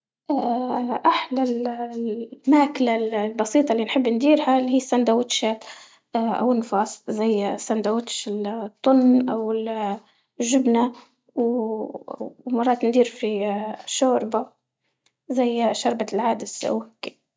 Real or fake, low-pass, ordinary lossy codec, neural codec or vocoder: real; none; none; none